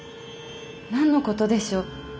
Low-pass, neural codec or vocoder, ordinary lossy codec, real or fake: none; none; none; real